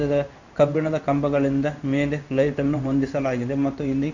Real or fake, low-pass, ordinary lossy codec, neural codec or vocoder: fake; 7.2 kHz; none; codec, 16 kHz in and 24 kHz out, 1 kbps, XY-Tokenizer